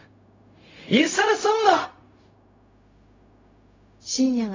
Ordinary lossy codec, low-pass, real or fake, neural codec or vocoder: AAC, 32 kbps; 7.2 kHz; fake; codec, 16 kHz, 0.4 kbps, LongCat-Audio-Codec